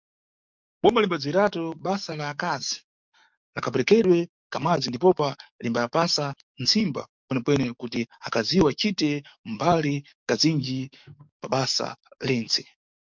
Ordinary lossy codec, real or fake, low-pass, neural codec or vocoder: MP3, 64 kbps; fake; 7.2 kHz; codec, 44.1 kHz, 7.8 kbps, DAC